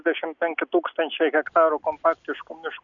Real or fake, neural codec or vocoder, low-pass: real; none; 7.2 kHz